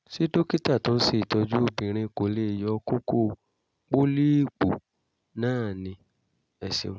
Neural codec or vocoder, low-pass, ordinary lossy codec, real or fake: none; none; none; real